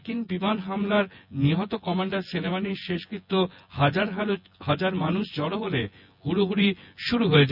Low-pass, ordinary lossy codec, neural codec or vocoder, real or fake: 5.4 kHz; none; vocoder, 24 kHz, 100 mel bands, Vocos; fake